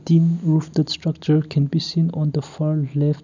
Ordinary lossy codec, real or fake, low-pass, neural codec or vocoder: none; real; 7.2 kHz; none